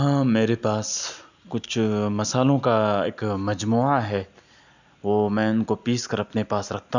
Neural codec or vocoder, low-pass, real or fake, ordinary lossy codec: none; 7.2 kHz; real; none